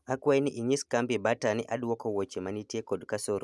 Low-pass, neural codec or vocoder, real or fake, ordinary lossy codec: none; none; real; none